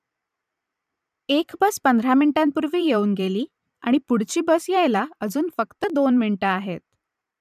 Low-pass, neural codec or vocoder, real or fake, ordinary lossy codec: 14.4 kHz; vocoder, 44.1 kHz, 128 mel bands every 512 samples, BigVGAN v2; fake; none